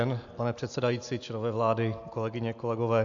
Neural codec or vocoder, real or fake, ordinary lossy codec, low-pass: none; real; AAC, 64 kbps; 7.2 kHz